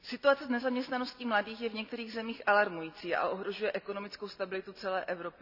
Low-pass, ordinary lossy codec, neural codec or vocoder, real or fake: 5.4 kHz; none; none; real